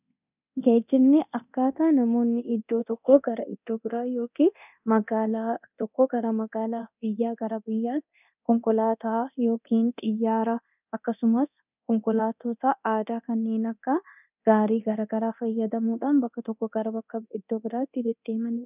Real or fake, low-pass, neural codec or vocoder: fake; 3.6 kHz; codec, 24 kHz, 0.9 kbps, DualCodec